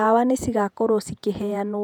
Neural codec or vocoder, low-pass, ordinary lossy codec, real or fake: vocoder, 48 kHz, 128 mel bands, Vocos; 19.8 kHz; none; fake